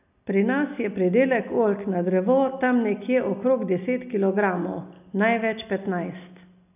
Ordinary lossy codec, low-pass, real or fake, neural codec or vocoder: none; 3.6 kHz; real; none